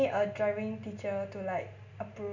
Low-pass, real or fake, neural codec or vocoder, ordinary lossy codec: 7.2 kHz; real; none; none